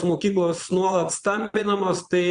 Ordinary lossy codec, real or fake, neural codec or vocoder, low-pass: Opus, 64 kbps; fake; vocoder, 22.05 kHz, 80 mel bands, Vocos; 9.9 kHz